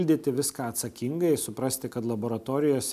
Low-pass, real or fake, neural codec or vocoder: 14.4 kHz; real; none